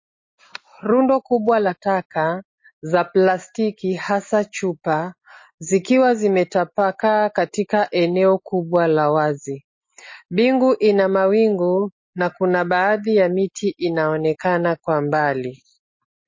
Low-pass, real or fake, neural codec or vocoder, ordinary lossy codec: 7.2 kHz; real; none; MP3, 32 kbps